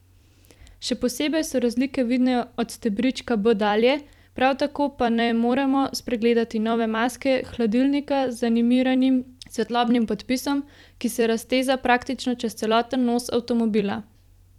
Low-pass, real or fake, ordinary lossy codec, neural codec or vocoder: 19.8 kHz; fake; none; vocoder, 44.1 kHz, 128 mel bands every 256 samples, BigVGAN v2